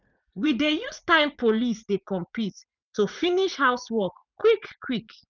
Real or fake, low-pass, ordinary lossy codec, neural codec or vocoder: fake; 7.2 kHz; none; vocoder, 44.1 kHz, 80 mel bands, Vocos